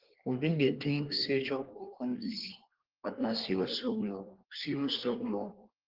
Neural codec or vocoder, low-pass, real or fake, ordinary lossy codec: codec, 24 kHz, 1 kbps, SNAC; 5.4 kHz; fake; Opus, 16 kbps